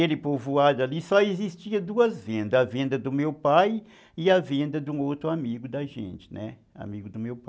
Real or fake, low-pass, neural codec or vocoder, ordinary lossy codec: real; none; none; none